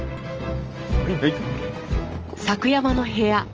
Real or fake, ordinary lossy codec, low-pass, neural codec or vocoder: real; Opus, 24 kbps; 7.2 kHz; none